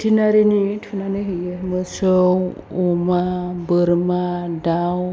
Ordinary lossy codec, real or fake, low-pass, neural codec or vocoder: none; real; none; none